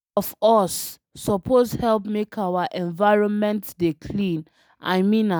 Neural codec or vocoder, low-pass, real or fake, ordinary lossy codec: none; none; real; none